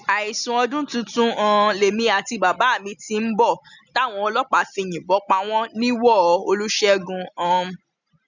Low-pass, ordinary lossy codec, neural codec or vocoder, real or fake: 7.2 kHz; none; none; real